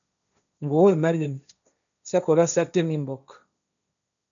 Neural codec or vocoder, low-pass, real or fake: codec, 16 kHz, 1.1 kbps, Voila-Tokenizer; 7.2 kHz; fake